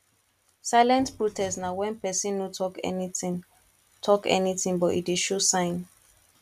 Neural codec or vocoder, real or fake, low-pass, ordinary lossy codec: none; real; 14.4 kHz; none